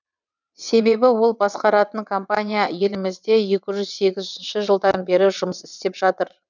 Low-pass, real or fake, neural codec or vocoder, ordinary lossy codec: 7.2 kHz; fake; vocoder, 22.05 kHz, 80 mel bands, WaveNeXt; none